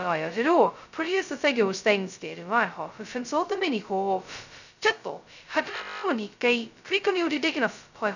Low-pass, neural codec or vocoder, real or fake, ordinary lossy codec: 7.2 kHz; codec, 16 kHz, 0.2 kbps, FocalCodec; fake; none